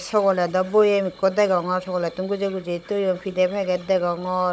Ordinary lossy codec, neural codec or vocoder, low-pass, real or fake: none; codec, 16 kHz, 16 kbps, FunCodec, trained on Chinese and English, 50 frames a second; none; fake